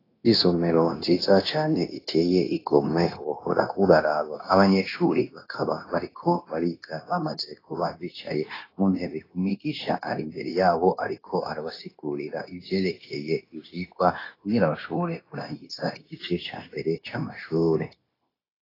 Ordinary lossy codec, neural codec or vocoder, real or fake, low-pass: AAC, 24 kbps; codec, 16 kHz, 0.9 kbps, LongCat-Audio-Codec; fake; 5.4 kHz